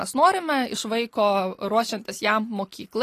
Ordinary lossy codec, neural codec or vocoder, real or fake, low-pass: AAC, 48 kbps; none; real; 14.4 kHz